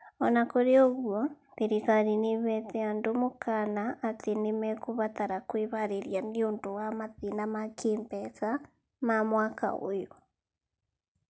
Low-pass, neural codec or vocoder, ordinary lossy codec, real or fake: none; none; none; real